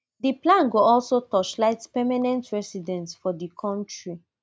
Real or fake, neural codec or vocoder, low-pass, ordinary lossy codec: real; none; none; none